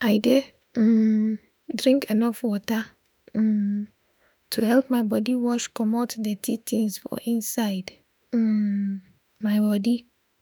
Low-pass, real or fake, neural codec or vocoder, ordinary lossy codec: none; fake; autoencoder, 48 kHz, 32 numbers a frame, DAC-VAE, trained on Japanese speech; none